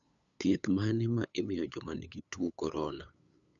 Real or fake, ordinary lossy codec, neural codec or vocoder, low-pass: fake; none; codec, 16 kHz, 8 kbps, FunCodec, trained on LibriTTS, 25 frames a second; 7.2 kHz